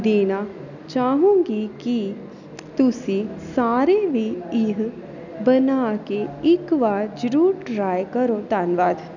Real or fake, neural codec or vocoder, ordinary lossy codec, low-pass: real; none; none; 7.2 kHz